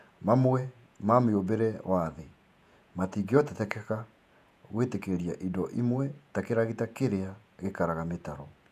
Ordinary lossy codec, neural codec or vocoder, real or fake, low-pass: none; vocoder, 48 kHz, 128 mel bands, Vocos; fake; 14.4 kHz